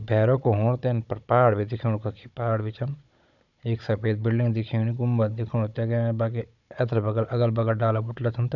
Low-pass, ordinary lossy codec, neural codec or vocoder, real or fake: 7.2 kHz; none; codec, 16 kHz, 16 kbps, FunCodec, trained on Chinese and English, 50 frames a second; fake